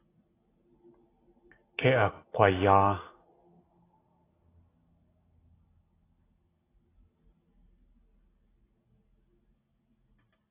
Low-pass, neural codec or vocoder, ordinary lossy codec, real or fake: 3.6 kHz; none; AAC, 16 kbps; real